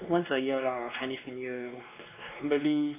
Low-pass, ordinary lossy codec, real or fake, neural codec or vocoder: 3.6 kHz; AAC, 24 kbps; fake; codec, 16 kHz, 2 kbps, X-Codec, WavLM features, trained on Multilingual LibriSpeech